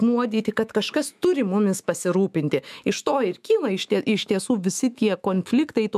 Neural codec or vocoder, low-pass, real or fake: autoencoder, 48 kHz, 128 numbers a frame, DAC-VAE, trained on Japanese speech; 14.4 kHz; fake